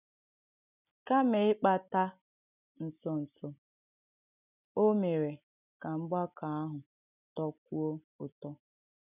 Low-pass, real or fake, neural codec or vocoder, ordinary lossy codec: 3.6 kHz; real; none; none